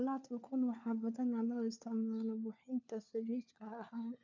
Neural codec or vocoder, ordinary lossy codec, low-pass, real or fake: codec, 16 kHz, 4 kbps, FunCodec, trained on LibriTTS, 50 frames a second; none; 7.2 kHz; fake